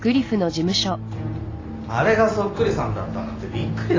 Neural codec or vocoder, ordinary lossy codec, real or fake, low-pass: none; AAC, 32 kbps; real; 7.2 kHz